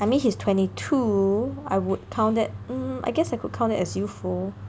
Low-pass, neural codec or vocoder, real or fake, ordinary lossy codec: none; none; real; none